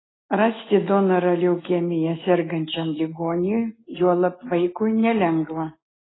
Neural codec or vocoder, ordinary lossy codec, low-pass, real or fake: none; AAC, 16 kbps; 7.2 kHz; real